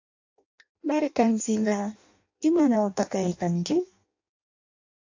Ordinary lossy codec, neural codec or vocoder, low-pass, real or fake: AAC, 48 kbps; codec, 16 kHz in and 24 kHz out, 0.6 kbps, FireRedTTS-2 codec; 7.2 kHz; fake